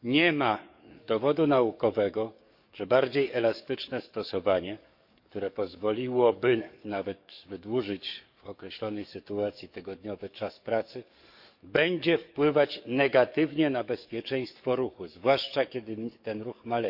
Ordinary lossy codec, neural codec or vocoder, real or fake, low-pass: none; codec, 44.1 kHz, 7.8 kbps, DAC; fake; 5.4 kHz